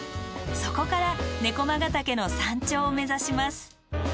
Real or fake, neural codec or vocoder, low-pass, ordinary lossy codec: real; none; none; none